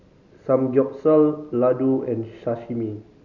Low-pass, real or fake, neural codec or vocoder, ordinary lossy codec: 7.2 kHz; real; none; none